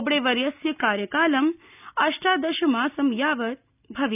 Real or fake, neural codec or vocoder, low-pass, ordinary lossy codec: real; none; 3.6 kHz; none